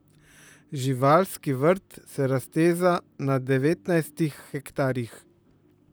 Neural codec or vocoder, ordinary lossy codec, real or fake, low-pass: vocoder, 44.1 kHz, 128 mel bands, Pupu-Vocoder; none; fake; none